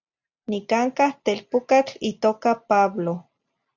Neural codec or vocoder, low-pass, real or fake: none; 7.2 kHz; real